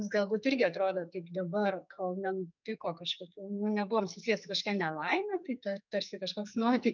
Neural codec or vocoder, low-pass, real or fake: codec, 44.1 kHz, 2.6 kbps, SNAC; 7.2 kHz; fake